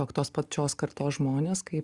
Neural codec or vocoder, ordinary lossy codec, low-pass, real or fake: none; Opus, 64 kbps; 10.8 kHz; real